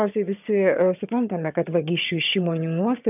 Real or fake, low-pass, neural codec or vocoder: fake; 3.6 kHz; vocoder, 22.05 kHz, 80 mel bands, HiFi-GAN